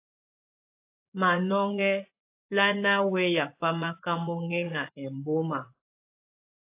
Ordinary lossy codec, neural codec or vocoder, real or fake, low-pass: AAC, 24 kbps; vocoder, 24 kHz, 100 mel bands, Vocos; fake; 3.6 kHz